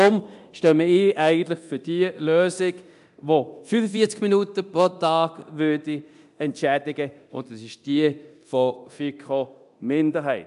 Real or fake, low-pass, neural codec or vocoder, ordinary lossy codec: fake; 10.8 kHz; codec, 24 kHz, 0.9 kbps, DualCodec; AAC, 96 kbps